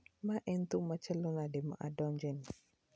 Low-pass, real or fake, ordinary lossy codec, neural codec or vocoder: none; real; none; none